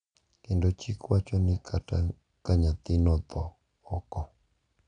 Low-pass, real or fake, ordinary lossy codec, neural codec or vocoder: 9.9 kHz; real; none; none